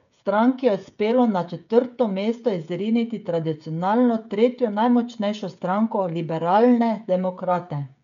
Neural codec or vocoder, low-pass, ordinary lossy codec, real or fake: codec, 16 kHz, 16 kbps, FreqCodec, smaller model; 7.2 kHz; none; fake